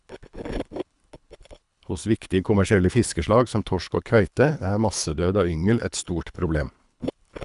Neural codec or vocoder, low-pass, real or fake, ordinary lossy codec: codec, 24 kHz, 3 kbps, HILCodec; 10.8 kHz; fake; none